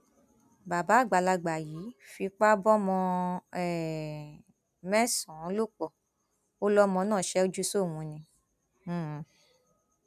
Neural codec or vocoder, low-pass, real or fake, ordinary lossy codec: none; 14.4 kHz; real; none